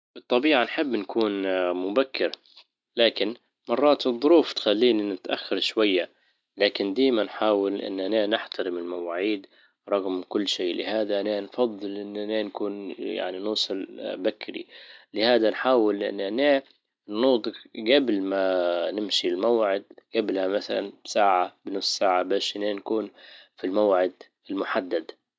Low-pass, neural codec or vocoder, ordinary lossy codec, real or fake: none; none; none; real